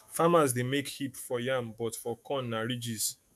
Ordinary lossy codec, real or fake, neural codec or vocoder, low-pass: MP3, 96 kbps; fake; autoencoder, 48 kHz, 128 numbers a frame, DAC-VAE, trained on Japanese speech; 14.4 kHz